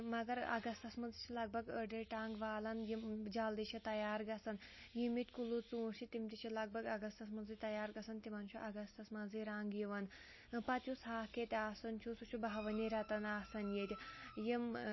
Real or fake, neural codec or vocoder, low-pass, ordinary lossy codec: real; none; 7.2 kHz; MP3, 24 kbps